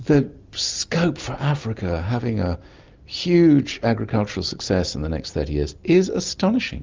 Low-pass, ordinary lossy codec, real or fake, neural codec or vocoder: 7.2 kHz; Opus, 32 kbps; real; none